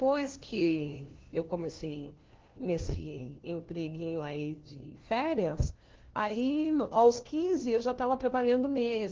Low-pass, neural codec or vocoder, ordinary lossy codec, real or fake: 7.2 kHz; codec, 16 kHz, 1 kbps, FunCodec, trained on LibriTTS, 50 frames a second; Opus, 16 kbps; fake